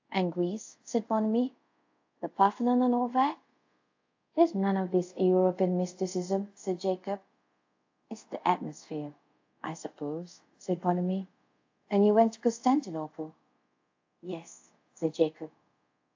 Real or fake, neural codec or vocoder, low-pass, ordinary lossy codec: fake; codec, 24 kHz, 0.5 kbps, DualCodec; 7.2 kHz; AAC, 48 kbps